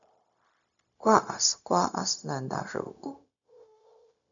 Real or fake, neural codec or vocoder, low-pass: fake; codec, 16 kHz, 0.4 kbps, LongCat-Audio-Codec; 7.2 kHz